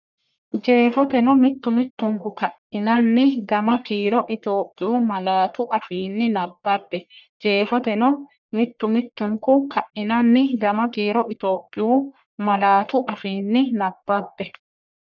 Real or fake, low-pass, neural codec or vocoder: fake; 7.2 kHz; codec, 44.1 kHz, 1.7 kbps, Pupu-Codec